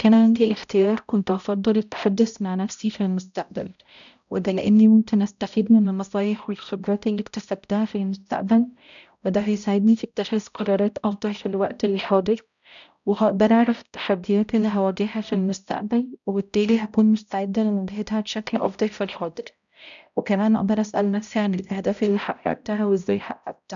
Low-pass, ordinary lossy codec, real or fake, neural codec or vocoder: 7.2 kHz; none; fake; codec, 16 kHz, 0.5 kbps, X-Codec, HuBERT features, trained on balanced general audio